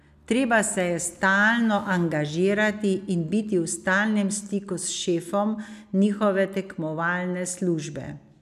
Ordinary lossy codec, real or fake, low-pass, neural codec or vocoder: none; real; 14.4 kHz; none